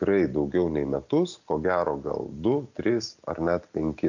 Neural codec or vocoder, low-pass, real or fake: none; 7.2 kHz; real